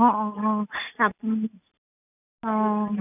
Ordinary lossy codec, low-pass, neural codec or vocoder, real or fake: none; 3.6 kHz; none; real